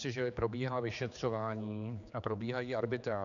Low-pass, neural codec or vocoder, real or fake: 7.2 kHz; codec, 16 kHz, 4 kbps, X-Codec, HuBERT features, trained on general audio; fake